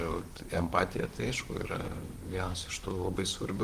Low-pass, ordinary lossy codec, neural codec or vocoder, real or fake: 14.4 kHz; Opus, 24 kbps; vocoder, 44.1 kHz, 128 mel bands, Pupu-Vocoder; fake